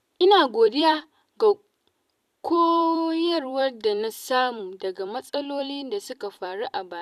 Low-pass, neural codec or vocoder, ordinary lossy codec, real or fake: 14.4 kHz; vocoder, 44.1 kHz, 128 mel bands every 256 samples, BigVGAN v2; none; fake